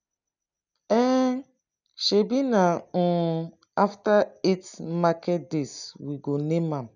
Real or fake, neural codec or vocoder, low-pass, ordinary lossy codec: real; none; 7.2 kHz; none